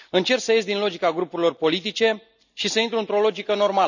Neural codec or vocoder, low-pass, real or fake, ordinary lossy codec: none; 7.2 kHz; real; none